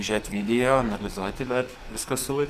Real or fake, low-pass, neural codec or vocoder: fake; 14.4 kHz; codec, 44.1 kHz, 2.6 kbps, SNAC